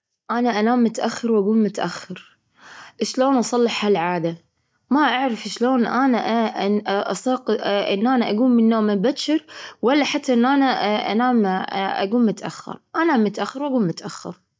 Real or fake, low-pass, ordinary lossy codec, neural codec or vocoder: real; none; none; none